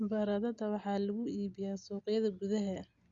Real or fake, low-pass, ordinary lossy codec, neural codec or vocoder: real; 7.2 kHz; Opus, 64 kbps; none